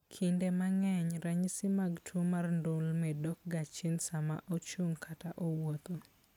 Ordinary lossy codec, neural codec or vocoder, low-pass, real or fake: none; none; 19.8 kHz; real